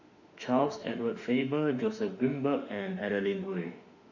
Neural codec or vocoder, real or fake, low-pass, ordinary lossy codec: autoencoder, 48 kHz, 32 numbers a frame, DAC-VAE, trained on Japanese speech; fake; 7.2 kHz; AAC, 48 kbps